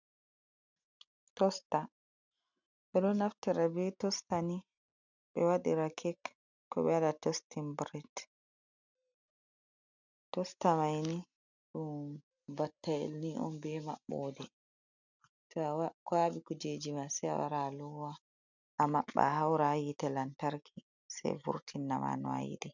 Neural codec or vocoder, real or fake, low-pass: none; real; 7.2 kHz